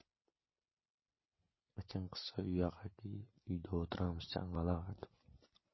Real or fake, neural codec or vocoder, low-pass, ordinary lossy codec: real; none; 7.2 kHz; MP3, 24 kbps